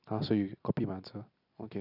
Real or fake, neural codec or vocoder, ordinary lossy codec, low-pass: real; none; none; 5.4 kHz